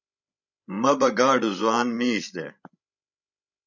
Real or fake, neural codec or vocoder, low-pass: fake; codec, 16 kHz, 16 kbps, FreqCodec, larger model; 7.2 kHz